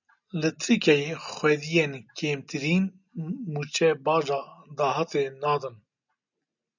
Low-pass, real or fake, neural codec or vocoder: 7.2 kHz; real; none